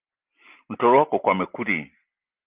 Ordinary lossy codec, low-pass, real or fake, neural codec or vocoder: Opus, 24 kbps; 3.6 kHz; real; none